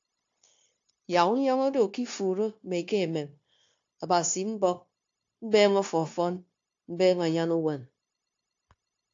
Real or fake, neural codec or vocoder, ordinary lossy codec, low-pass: fake; codec, 16 kHz, 0.9 kbps, LongCat-Audio-Codec; AAC, 48 kbps; 7.2 kHz